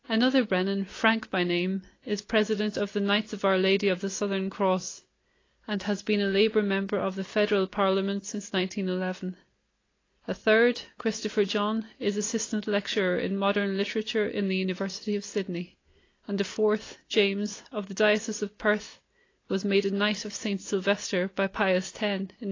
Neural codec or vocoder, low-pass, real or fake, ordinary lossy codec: none; 7.2 kHz; real; AAC, 32 kbps